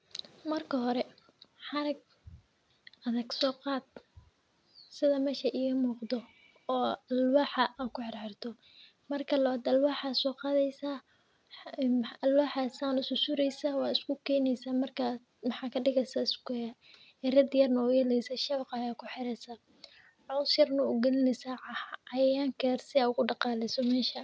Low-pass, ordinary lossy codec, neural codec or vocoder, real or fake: none; none; none; real